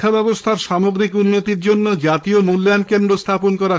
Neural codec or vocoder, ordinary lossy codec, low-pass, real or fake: codec, 16 kHz, 4.8 kbps, FACodec; none; none; fake